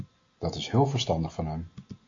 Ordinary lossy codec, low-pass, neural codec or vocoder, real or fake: AAC, 48 kbps; 7.2 kHz; none; real